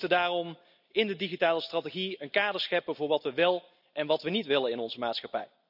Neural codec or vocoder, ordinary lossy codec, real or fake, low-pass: none; none; real; 5.4 kHz